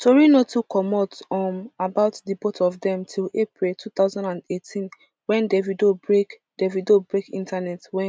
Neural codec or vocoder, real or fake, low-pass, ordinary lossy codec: none; real; none; none